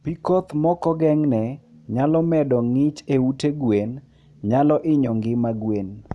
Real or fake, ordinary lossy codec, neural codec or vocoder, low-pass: real; none; none; none